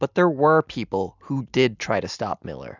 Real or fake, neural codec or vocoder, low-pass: real; none; 7.2 kHz